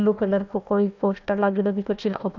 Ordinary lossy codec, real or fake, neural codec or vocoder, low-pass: none; fake; codec, 16 kHz, 1 kbps, FunCodec, trained on Chinese and English, 50 frames a second; 7.2 kHz